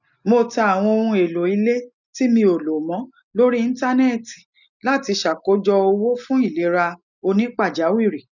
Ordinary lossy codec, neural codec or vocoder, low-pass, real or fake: none; none; 7.2 kHz; real